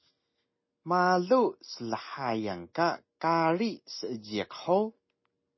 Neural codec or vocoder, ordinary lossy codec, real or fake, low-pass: none; MP3, 24 kbps; real; 7.2 kHz